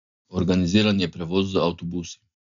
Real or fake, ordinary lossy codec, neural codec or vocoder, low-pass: real; none; none; 7.2 kHz